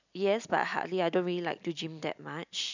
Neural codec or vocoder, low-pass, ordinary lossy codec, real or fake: none; 7.2 kHz; none; real